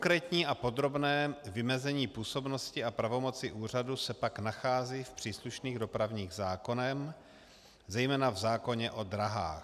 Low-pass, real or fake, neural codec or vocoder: 14.4 kHz; real; none